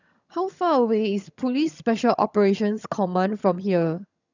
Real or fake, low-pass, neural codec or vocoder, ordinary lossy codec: fake; 7.2 kHz; vocoder, 22.05 kHz, 80 mel bands, HiFi-GAN; none